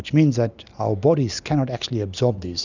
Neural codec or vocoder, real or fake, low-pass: none; real; 7.2 kHz